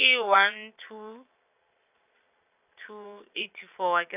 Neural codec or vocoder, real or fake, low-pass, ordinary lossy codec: vocoder, 22.05 kHz, 80 mel bands, Vocos; fake; 3.6 kHz; AAC, 32 kbps